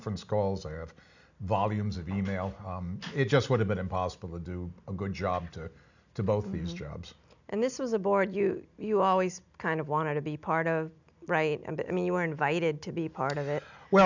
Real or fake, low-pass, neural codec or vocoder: real; 7.2 kHz; none